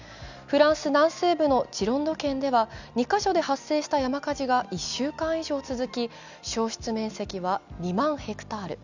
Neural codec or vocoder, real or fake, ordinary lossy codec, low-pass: none; real; none; 7.2 kHz